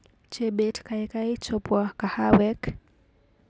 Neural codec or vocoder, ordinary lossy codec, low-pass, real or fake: none; none; none; real